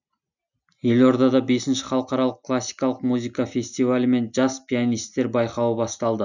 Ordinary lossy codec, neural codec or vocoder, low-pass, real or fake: none; none; 7.2 kHz; real